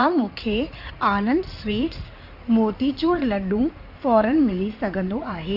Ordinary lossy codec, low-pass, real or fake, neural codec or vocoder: none; 5.4 kHz; fake; codec, 16 kHz in and 24 kHz out, 2.2 kbps, FireRedTTS-2 codec